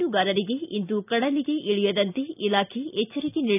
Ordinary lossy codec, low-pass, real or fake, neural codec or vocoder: none; 3.6 kHz; real; none